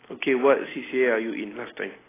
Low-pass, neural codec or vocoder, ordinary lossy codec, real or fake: 3.6 kHz; none; AAC, 16 kbps; real